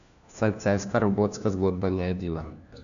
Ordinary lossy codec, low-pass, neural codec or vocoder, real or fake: none; 7.2 kHz; codec, 16 kHz, 1 kbps, FunCodec, trained on LibriTTS, 50 frames a second; fake